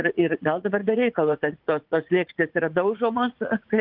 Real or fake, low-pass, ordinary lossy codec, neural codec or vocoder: fake; 5.4 kHz; Opus, 32 kbps; vocoder, 44.1 kHz, 80 mel bands, Vocos